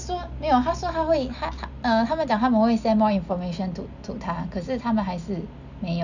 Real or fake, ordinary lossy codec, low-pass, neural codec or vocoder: real; none; 7.2 kHz; none